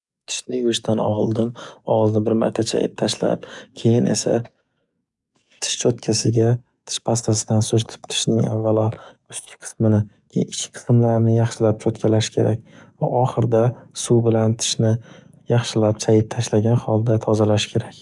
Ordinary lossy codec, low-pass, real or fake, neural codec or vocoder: none; 10.8 kHz; fake; codec, 44.1 kHz, 7.8 kbps, DAC